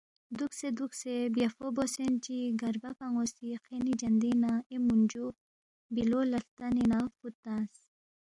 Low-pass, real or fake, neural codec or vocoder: 10.8 kHz; real; none